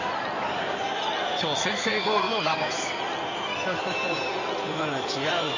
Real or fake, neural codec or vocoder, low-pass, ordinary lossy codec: fake; codec, 16 kHz in and 24 kHz out, 2.2 kbps, FireRedTTS-2 codec; 7.2 kHz; none